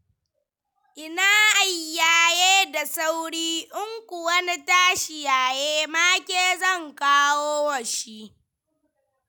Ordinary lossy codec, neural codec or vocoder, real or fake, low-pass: none; none; real; none